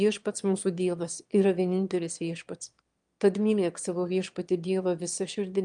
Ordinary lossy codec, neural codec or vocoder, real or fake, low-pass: Opus, 32 kbps; autoencoder, 22.05 kHz, a latent of 192 numbers a frame, VITS, trained on one speaker; fake; 9.9 kHz